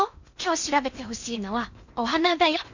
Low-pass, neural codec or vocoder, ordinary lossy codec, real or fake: 7.2 kHz; codec, 16 kHz in and 24 kHz out, 0.8 kbps, FocalCodec, streaming, 65536 codes; none; fake